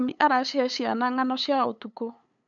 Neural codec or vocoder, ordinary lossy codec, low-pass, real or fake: codec, 16 kHz, 8 kbps, FunCodec, trained on LibriTTS, 25 frames a second; MP3, 96 kbps; 7.2 kHz; fake